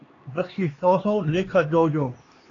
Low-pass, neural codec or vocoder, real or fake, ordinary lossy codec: 7.2 kHz; codec, 16 kHz, 2 kbps, X-Codec, HuBERT features, trained on LibriSpeech; fake; AAC, 32 kbps